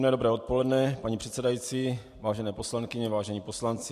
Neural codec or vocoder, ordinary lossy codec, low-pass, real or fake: none; MP3, 64 kbps; 14.4 kHz; real